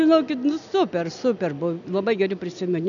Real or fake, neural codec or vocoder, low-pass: real; none; 7.2 kHz